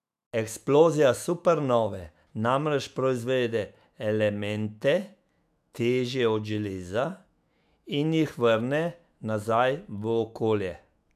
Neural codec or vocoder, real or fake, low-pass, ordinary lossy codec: autoencoder, 48 kHz, 128 numbers a frame, DAC-VAE, trained on Japanese speech; fake; 14.4 kHz; MP3, 96 kbps